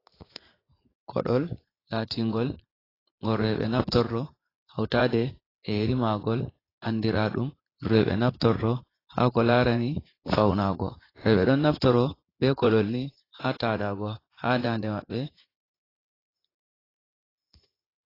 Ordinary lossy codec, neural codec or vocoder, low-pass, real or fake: AAC, 24 kbps; vocoder, 44.1 kHz, 80 mel bands, Vocos; 5.4 kHz; fake